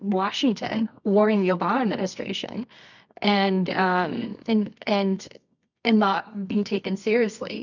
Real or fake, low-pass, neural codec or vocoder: fake; 7.2 kHz; codec, 24 kHz, 0.9 kbps, WavTokenizer, medium music audio release